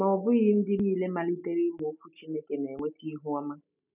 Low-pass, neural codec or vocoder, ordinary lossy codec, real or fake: 3.6 kHz; none; none; real